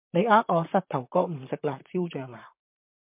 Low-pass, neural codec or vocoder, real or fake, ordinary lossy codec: 3.6 kHz; vocoder, 44.1 kHz, 128 mel bands, Pupu-Vocoder; fake; MP3, 32 kbps